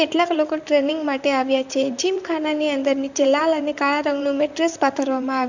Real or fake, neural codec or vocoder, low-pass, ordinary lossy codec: fake; vocoder, 44.1 kHz, 128 mel bands, Pupu-Vocoder; 7.2 kHz; none